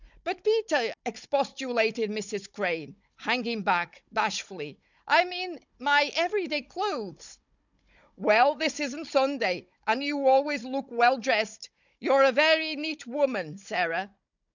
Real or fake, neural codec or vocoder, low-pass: fake; codec, 16 kHz, 16 kbps, FunCodec, trained on Chinese and English, 50 frames a second; 7.2 kHz